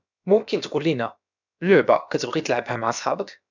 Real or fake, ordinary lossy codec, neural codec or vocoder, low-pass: fake; none; codec, 16 kHz, about 1 kbps, DyCAST, with the encoder's durations; 7.2 kHz